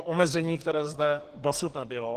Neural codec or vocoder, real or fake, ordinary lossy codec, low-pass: codec, 44.1 kHz, 2.6 kbps, SNAC; fake; Opus, 16 kbps; 14.4 kHz